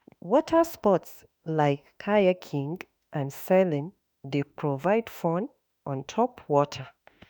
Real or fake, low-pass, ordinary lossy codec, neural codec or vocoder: fake; none; none; autoencoder, 48 kHz, 32 numbers a frame, DAC-VAE, trained on Japanese speech